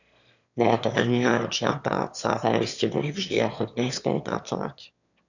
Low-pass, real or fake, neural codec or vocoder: 7.2 kHz; fake; autoencoder, 22.05 kHz, a latent of 192 numbers a frame, VITS, trained on one speaker